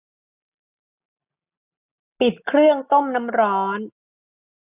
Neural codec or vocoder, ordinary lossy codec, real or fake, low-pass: none; none; real; 3.6 kHz